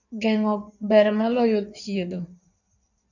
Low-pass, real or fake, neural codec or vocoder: 7.2 kHz; fake; codec, 16 kHz in and 24 kHz out, 1.1 kbps, FireRedTTS-2 codec